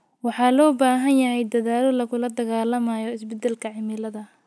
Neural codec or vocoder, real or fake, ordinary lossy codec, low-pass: none; real; none; none